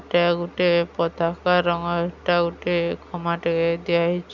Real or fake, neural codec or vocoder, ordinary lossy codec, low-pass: real; none; none; 7.2 kHz